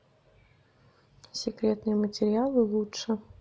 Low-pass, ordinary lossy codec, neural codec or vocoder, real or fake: none; none; none; real